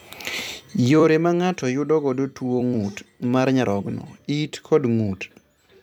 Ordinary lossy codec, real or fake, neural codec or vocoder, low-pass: none; fake; vocoder, 44.1 kHz, 128 mel bands every 256 samples, BigVGAN v2; 19.8 kHz